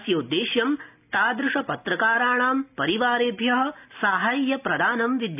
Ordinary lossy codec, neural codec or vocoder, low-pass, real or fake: none; none; 3.6 kHz; real